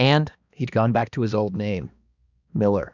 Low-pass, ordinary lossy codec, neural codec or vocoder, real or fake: 7.2 kHz; Opus, 64 kbps; codec, 16 kHz, 2 kbps, X-Codec, HuBERT features, trained on balanced general audio; fake